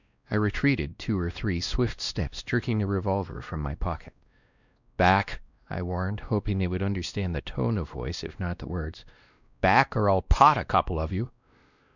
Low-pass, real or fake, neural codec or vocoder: 7.2 kHz; fake; codec, 16 kHz, 1 kbps, X-Codec, WavLM features, trained on Multilingual LibriSpeech